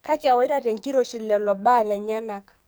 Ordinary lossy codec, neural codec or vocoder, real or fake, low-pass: none; codec, 44.1 kHz, 2.6 kbps, SNAC; fake; none